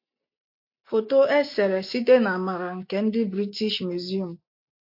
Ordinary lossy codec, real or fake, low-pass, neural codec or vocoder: MP3, 32 kbps; real; 5.4 kHz; none